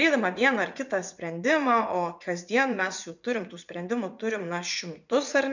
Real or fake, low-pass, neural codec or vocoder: fake; 7.2 kHz; vocoder, 44.1 kHz, 80 mel bands, Vocos